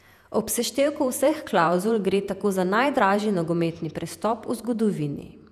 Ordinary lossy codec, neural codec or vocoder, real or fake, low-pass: none; vocoder, 48 kHz, 128 mel bands, Vocos; fake; 14.4 kHz